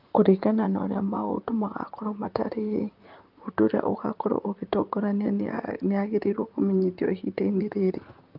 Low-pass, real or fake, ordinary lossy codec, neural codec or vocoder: 5.4 kHz; fake; Opus, 32 kbps; vocoder, 44.1 kHz, 128 mel bands, Pupu-Vocoder